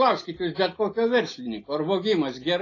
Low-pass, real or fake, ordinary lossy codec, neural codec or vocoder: 7.2 kHz; real; AAC, 32 kbps; none